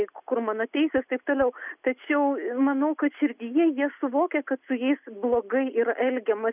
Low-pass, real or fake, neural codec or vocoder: 3.6 kHz; real; none